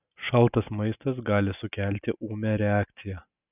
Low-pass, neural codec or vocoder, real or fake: 3.6 kHz; none; real